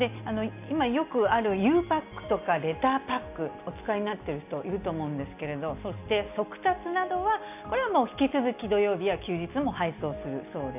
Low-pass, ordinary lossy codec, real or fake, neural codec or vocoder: 3.6 kHz; AAC, 32 kbps; real; none